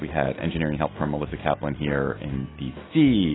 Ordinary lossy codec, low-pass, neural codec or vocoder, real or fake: AAC, 16 kbps; 7.2 kHz; none; real